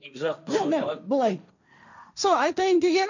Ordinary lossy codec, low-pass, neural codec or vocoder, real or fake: none; 7.2 kHz; codec, 16 kHz, 1.1 kbps, Voila-Tokenizer; fake